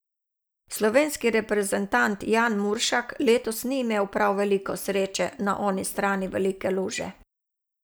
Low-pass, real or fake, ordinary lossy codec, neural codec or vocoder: none; real; none; none